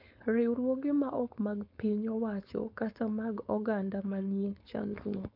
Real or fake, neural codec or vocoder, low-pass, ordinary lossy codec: fake; codec, 16 kHz, 4.8 kbps, FACodec; 5.4 kHz; MP3, 48 kbps